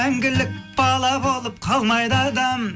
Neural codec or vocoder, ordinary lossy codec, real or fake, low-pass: none; none; real; none